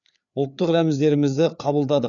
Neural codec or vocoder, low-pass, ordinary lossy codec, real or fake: codec, 16 kHz, 4 kbps, FreqCodec, larger model; 7.2 kHz; none; fake